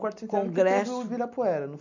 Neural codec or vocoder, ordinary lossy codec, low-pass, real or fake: none; none; 7.2 kHz; real